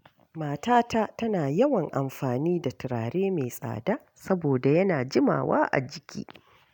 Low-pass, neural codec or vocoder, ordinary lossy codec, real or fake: 19.8 kHz; none; none; real